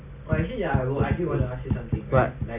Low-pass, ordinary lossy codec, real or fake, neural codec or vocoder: 3.6 kHz; none; real; none